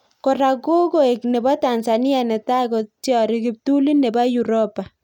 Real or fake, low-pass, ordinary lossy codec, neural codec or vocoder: real; 19.8 kHz; none; none